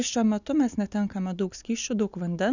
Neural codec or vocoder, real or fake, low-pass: none; real; 7.2 kHz